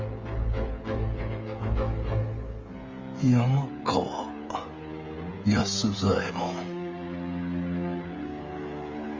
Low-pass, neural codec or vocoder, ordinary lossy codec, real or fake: 7.2 kHz; codec, 16 kHz, 16 kbps, FreqCodec, smaller model; Opus, 32 kbps; fake